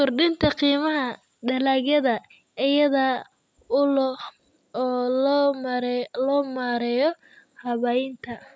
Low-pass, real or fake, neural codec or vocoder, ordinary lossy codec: none; real; none; none